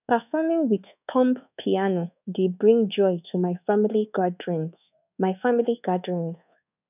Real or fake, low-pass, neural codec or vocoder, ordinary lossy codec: fake; 3.6 kHz; codec, 24 kHz, 1.2 kbps, DualCodec; none